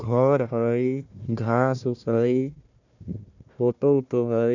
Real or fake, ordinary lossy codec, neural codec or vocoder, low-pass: fake; none; codec, 16 kHz, 1 kbps, FunCodec, trained on Chinese and English, 50 frames a second; 7.2 kHz